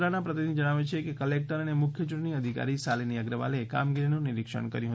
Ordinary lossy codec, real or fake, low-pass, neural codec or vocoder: none; real; none; none